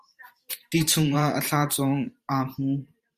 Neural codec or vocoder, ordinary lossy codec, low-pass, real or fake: vocoder, 44.1 kHz, 128 mel bands every 512 samples, BigVGAN v2; Opus, 64 kbps; 14.4 kHz; fake